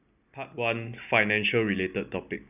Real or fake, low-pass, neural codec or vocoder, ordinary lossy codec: real; 3.6 kHz; none; none